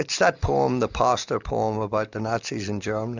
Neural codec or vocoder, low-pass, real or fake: none; 7.2 kHz; real